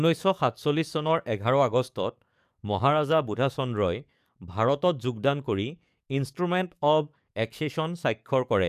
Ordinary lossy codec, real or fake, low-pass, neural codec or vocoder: none; fake; 14.4 kHz; codec, 44.1 kHz, 7.8 kbps, DAC